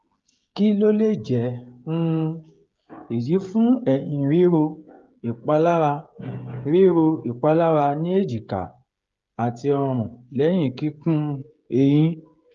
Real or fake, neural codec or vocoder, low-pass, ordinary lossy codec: fake; codec, 16 kHz, 16 kbps, FreqCodec, smaller model; 7.2 kHz; Opus, 32 kbps